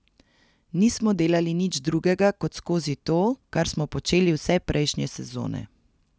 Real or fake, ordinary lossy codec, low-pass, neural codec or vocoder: real; none; none; none